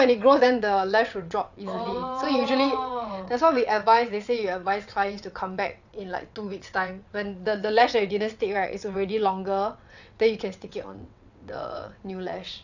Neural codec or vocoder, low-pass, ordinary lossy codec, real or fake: vocoder, 22.05 kHz, 80 mel bands, WaveNeXt; 7.2 kHz; none; fake